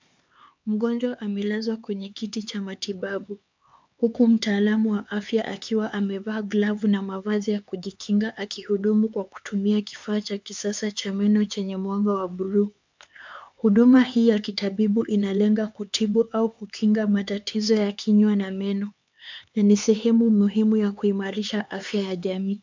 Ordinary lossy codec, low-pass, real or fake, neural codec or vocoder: MP3, 64 kbps; 7.2 kHz; fake; codec, 16 kHz, 4 kbps, X-Codec, HuBERT features, trained on LibriSpeech